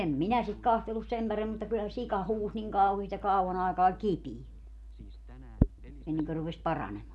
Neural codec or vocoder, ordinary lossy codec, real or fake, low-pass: none; none; real; none